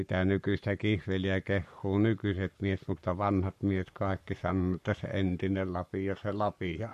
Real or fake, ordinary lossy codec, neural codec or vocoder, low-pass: fake; MP3, 64 kbps; codec, 44.1 kHz, 7.8 kbps, Pupu-Codec; 14.4 kHz